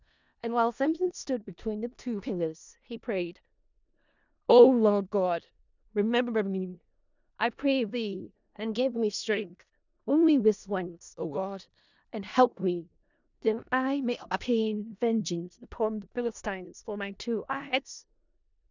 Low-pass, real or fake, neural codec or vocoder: 7.2 kHz; fake; codec, 16 kHz in and 24 kHz out, 0.4 kbps, LongCat-Audio-Codec, four codebook decoder